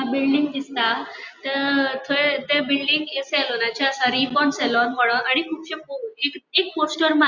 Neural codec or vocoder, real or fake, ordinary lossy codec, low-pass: none; real; Opus, 64 kbps; 7.2 kHz